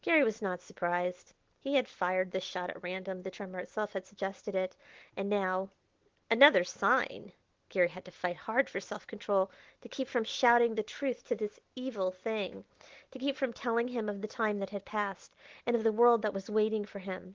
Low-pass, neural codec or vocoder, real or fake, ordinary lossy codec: 7.2 kHz; none; real; Opus, 16 kbps